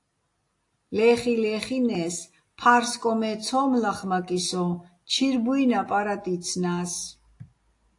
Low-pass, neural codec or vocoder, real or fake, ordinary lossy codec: 10.8 kHz; none; real; AAC, 48 kbps